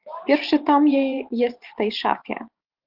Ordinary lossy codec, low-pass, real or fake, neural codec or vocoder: Opus, 32 kbps; 5.4 kHz; fake; vocoder, 44.1 kHz, 128 mel bands every 512 samples, BigVGAN v2